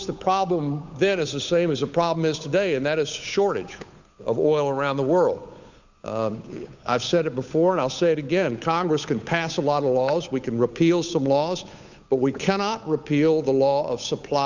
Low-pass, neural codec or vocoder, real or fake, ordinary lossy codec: 7.2 kHz; codec, 16 kHz, 8 kbps, FunCodec, trained on Chinese and English, 25 frames a second; fake; Opus, 64 kbps